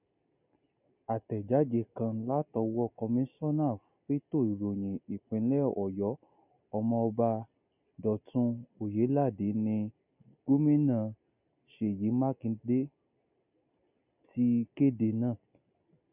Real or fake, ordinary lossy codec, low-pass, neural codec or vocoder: real; none; 3.6 kHz; none